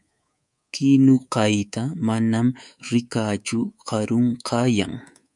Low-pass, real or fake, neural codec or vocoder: 10.8 kHz; fake; codec, 24 kHz, 3.1 kbps, DualCodec